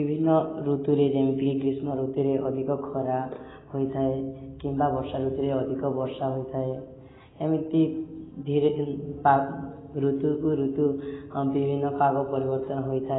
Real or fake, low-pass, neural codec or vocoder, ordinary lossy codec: real; 7.2 kHz; none; AAC, 16 kbps